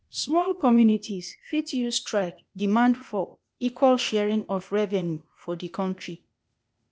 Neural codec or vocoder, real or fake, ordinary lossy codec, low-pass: codec, 16 kHz, 0.8 kbps, ZipCodec; fake; none; none